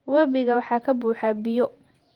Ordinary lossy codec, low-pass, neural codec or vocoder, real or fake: Opus, 24 kbps; 19.8 kHz; vocoder, 48 kHz, 128 mel bands, Vocos; fake